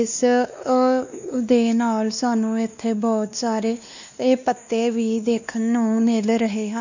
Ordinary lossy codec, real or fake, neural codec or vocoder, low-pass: none; fake; codec, 16 kHz, 2 kbps, X-Codec, WavLM features, trained on Multilingual LibriSpeech; 7.2 kHz